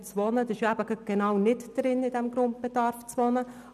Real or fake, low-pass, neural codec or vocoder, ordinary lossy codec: real; 14.4 kHz; none; none